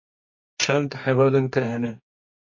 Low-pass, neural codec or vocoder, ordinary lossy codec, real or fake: 7.2 kHz; codec, 24 kHz, 0.9 kbps, WavTokenizer, medium music audio release; MP3, 32 kbps; fake